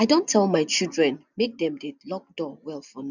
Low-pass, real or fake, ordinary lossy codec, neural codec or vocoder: 7.2 kHz; real; none; none